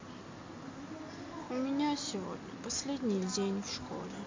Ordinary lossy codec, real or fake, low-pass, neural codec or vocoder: MP3, 48 kbps; real; 7.2 kHz; none